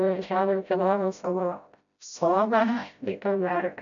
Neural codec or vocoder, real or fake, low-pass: codec, 16 kHz, 0.5 kbps, FreqCodec, smaller model; fake; 7.2 kHz